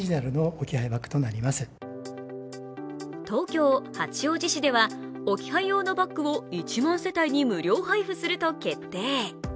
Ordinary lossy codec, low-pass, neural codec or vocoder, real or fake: none; none; none; real